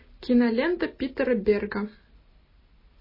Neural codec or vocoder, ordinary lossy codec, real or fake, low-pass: none; MP3, 24 kbps; real; 5.4 kHz